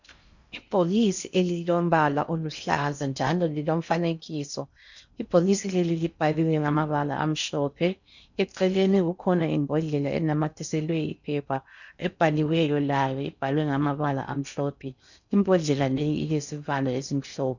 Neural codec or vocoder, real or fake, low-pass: codec, 16 kHz in and 24 kHz out, 0.6 kbps, FocalCodec, streaming, 4096 codes; fake; 7.2 kHz